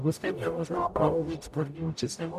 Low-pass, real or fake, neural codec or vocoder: 14.4 kHz; fake; codec, 44.1 kHz, 0.9 kbps, DAC